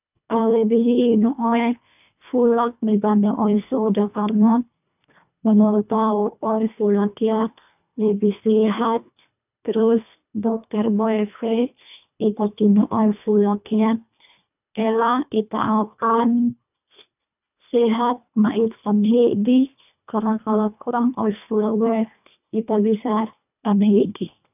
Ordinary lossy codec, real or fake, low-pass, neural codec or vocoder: none; fake; 3.6 kHz; codec, 24 kHz, 1.5 kbps, HILCodec